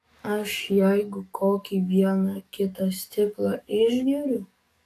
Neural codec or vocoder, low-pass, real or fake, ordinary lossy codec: codec, 44.1 kHz, 7.8 kbps, DAC; 14.4 kHz; fake; AAC, 64 kbps